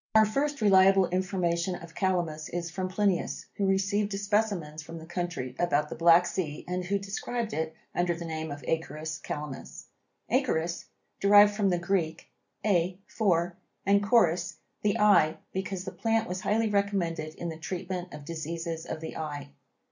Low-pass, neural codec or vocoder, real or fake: 7.2 kHz; none; real